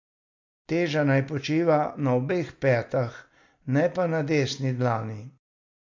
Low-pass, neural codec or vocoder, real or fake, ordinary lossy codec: 7.2 kHz; none; real; MP3, 48 kbps